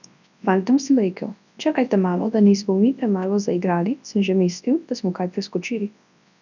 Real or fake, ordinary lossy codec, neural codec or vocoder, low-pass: fake; none; codec, 24 kHz, 0.9 kbps, WavTokenizer, large speech release; 7.2 kHz